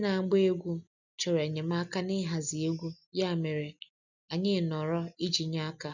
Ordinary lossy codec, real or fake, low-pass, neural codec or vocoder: none; real; 7.2 kHz; none